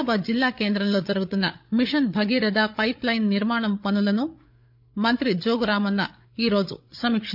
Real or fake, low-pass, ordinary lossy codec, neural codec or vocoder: fake; 5.4 kHz; none; codec, 16 kHz, 8 kbps, FreqCodec, larger model